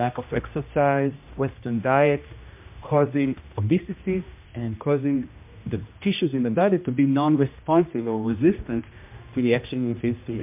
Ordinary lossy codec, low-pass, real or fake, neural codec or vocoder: MP3, 32 kbps; 3.6 kHz; fake; codec, 16 kHz, 1 kbps, X-Codec, HuBERT features, trained on general audio